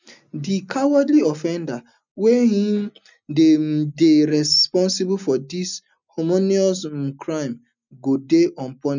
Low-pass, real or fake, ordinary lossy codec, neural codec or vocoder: 7.2 kHz; real; none; none